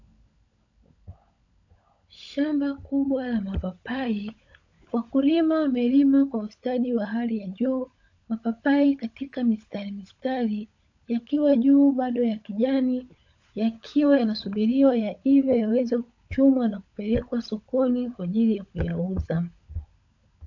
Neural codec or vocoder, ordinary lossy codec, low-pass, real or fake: codec, 16 kHz, 16 kbps, FunCodec, trained on LibriTTS, 50 frames a second; AAC, 48 kbps; 7.2 kHz; fake